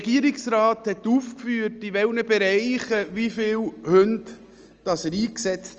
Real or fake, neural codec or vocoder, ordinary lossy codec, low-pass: real; none; Opus, 24 kbps; 7.2 kHz